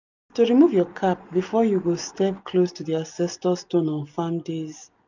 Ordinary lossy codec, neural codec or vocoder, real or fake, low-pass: none; none; real; 7.2 kHz